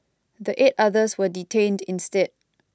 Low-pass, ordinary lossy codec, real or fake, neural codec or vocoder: none; none; real; none